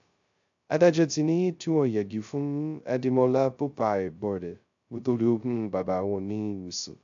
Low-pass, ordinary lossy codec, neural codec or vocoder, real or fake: 7.2 kHz; AAC, 64 kbps; codec, 16 kHz, 0.2 kbps, FocalCodec; fake